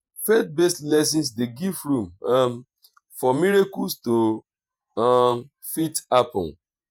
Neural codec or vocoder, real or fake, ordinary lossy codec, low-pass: vocoder, 48 kHz, 128 mel bands, Vocos; fake; none; none